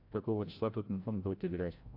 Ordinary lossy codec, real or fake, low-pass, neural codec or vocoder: AAC, 48 kbps; fake; 5.4 kHz; codec, 16 kHz, 0.5 kbps, FreqCodec, larger model